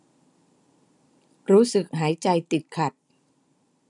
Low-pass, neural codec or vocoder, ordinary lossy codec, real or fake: 10.8 kHz; none; none; real